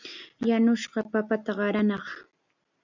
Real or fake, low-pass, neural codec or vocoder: real; 7.2 kHz; none